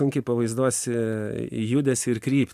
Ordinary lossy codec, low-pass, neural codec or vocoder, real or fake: AAC, 96 kbps; 14.4 kHz; vocoder, 44.1 kHz, 128 mel bands every 256 samples, BigVGAN v2; fake